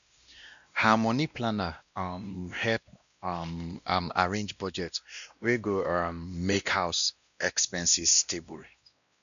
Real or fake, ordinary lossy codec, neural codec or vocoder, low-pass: fake; none; codec, 16 kHz, 1 kbps, X-Codec, WavLM features, trained on Multilingual LibriSpeech; 7.2 kHz